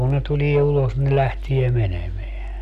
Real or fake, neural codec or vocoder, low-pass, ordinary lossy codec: real; none; 14.4 kHz; none